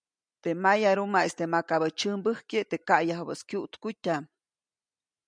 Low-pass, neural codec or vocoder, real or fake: 9.9 kHz; none; real